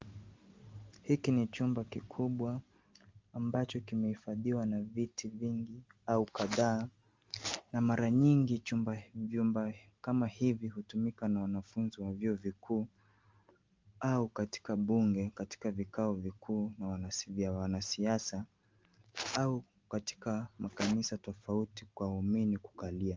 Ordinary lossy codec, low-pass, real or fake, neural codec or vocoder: Opus, 32 kbps; 7.2 kHz; real; none